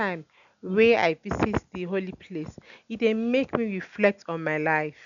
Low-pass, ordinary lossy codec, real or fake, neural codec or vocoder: 7.2 kHz; none; real; none